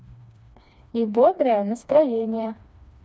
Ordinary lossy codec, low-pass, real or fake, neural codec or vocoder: none; none; fake; codec, 16 kHz, 2 kbps, FreqCodec, smaller model